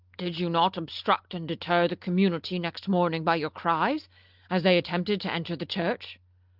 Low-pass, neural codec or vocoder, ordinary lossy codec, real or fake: 5.4 kHz; none; Opus, 32 kbps; real